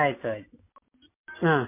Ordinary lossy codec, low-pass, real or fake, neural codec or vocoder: MP3, 24 kbps; 3.6 kHz; real; none